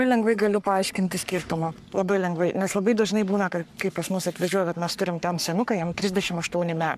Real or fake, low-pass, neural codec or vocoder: fake; 14.4 kHz; codec, 44.1 kHz, 3.4 kbps, Pupu-Codec